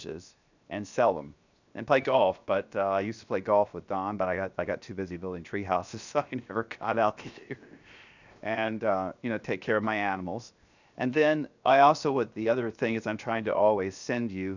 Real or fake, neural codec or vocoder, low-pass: fake; codec, 16 kHz, 0.7 kbps, FocalCodec; 7.2 kHz